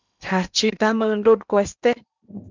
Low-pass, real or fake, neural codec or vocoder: 7.2 kHz; fake; codec, 16 kHz in and 24 kHz out, 0.8 kbps, FocalCodec, streaming, 65536 codes